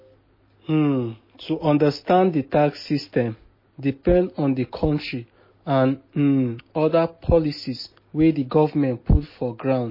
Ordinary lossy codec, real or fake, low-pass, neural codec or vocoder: MP3, 24 kbps; real; 5.4 kHz; none